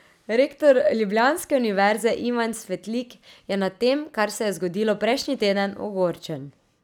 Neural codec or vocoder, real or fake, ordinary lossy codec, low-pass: none; real; none; 19.8 kHz